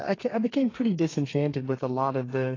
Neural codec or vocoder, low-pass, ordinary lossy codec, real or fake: codec, 32 kHz, 1.9 kbps, SNAC; 7.2 kHz; AAC, 32 kbps; fake